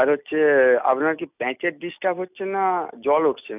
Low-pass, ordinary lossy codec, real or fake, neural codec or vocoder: 3.6 kHz; none; real; none